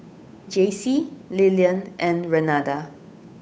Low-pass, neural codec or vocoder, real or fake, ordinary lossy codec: none; codec, 16 kHz, 8 kbps, FunCodec, trained on Chinese and English, 25 frames a second; fake; none